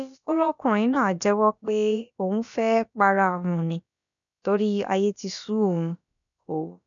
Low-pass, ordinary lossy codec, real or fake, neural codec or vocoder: 7.2 kHz; none; fake; codec, 16 kHz, about 1 kbps, DyCAST, with the encoder's durations